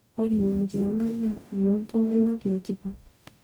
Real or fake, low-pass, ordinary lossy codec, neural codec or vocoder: fake; none; none; codec, 44.1 kHz, 0.9 kbps, DAC